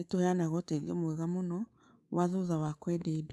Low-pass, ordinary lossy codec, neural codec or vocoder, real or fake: none; none; codec, 24 kHz, 3.1 kbps, DualCodec; fake